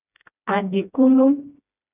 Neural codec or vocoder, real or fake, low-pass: codec, 16 kHz, 1 kbps, FreqCodec, smaller model; fake; 3.6 kHz